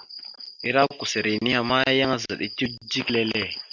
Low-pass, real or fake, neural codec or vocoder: 7.2 kHz; real; none